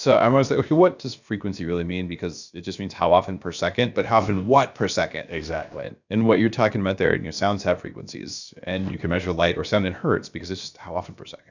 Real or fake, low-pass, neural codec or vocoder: fake; 7.2 kHz; codec, 16 kHz, about 1 kbps, DyCAST, with the encoder's durations